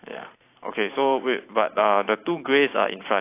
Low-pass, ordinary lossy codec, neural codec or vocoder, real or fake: 3.6 kHz; AAC, 24 kbps; vocoder, 44.1 kHz, 128 mel bands every 512 samples, BigVGAN v2; fake